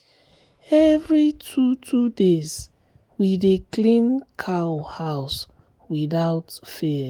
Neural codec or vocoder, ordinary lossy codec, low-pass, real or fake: autoencoder, 48 kHz, 128 numbers a frame, DAC-VAE, trained on Japanese speech; Opus, 24 kbps; 19.8 kHz; fake